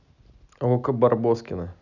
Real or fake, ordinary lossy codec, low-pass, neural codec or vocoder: real; none; 7.2 kHz; none